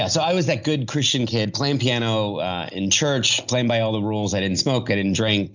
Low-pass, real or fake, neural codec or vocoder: 7.2 kHz; real; none